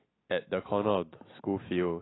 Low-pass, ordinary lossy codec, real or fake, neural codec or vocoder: 7.2 kHz; AAC, 16 kbps; real; none